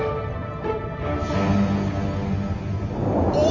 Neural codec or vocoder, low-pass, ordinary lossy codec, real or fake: none; 7.2 kHz; Opus, 32 kbps; real